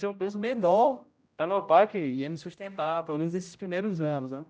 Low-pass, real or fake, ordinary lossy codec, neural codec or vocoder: none; fake; none; codec, 16 kHz, 0.5 kbps, X-Codec, HuBERT features, trained on general audio